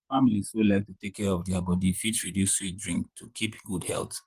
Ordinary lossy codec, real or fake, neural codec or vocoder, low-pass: Opus, 24 kbps; fake; vocoder, 44.1 kHz, 128 mel bands, Pupu-Vocoder; 14.4 kHz